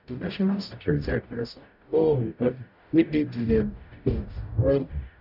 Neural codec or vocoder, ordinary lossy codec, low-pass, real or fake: codec, 44.1 kHz, 0.9 kbps, DAC; none; 5.4 kHz; fake